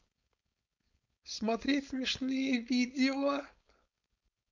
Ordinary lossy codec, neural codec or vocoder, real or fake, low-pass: none; codec, 16 kHz, 4.8 kbps, FACodec; fake; 7.2 kHz